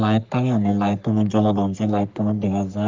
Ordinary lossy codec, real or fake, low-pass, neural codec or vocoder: Opus, 32 kbps; fake; 7.2 kHz; codec, 44.1 kHz, 3.4 kbps, Pupu-Codec